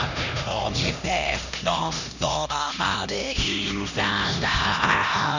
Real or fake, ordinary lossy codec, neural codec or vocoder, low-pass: fake; none; codec, 16 kHz, 1 kbps, X-Codec, HuBERT features, trained on LibriSpeech; 7.2 kHz